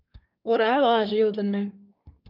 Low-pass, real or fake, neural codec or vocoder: 5.4 kHz; fake; codec, 24 kHz, 1 kbps, SNAC